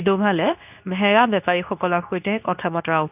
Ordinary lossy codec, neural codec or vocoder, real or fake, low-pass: none; codec, 24 kHz, 0.9 kbps, WavTokenizer, medium speech release version 2; fake; 3.6 kHz